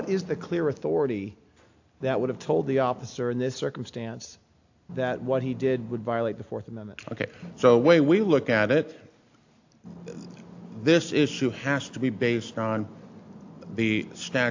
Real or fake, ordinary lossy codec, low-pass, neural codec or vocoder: real; AAC, 48 kbps; 7.2 kHz; none